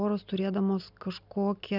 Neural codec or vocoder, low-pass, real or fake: none; 5.4 kHz; real